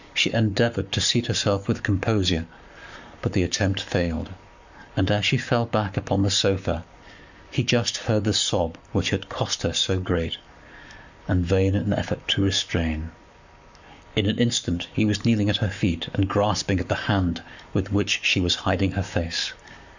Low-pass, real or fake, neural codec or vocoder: 7.2 kHz; fake; codec, 44.1 kHz, 7.8 kbps, Pupu-Codec